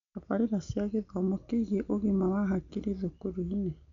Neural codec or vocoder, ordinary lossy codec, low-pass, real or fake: none; none; 7.2 kHz; real